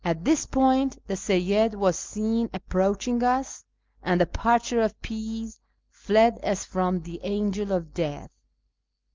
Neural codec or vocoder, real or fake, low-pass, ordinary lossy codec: none; real; 7.2 kHz; Opus, 24 kbps